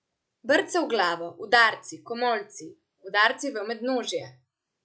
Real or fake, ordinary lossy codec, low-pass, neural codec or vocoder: real; none; none; none